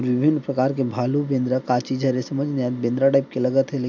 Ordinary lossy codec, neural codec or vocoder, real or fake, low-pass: none; none; real; 7.2 kHz